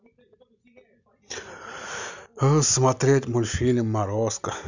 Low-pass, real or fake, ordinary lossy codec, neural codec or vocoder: 7.2 kHz; real; none; none